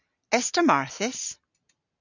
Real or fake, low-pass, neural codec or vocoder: real; 7.2 kHz; none